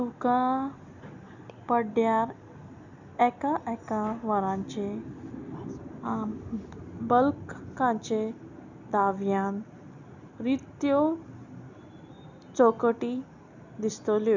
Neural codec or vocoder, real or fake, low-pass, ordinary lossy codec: none; real; 7.2 kHz; none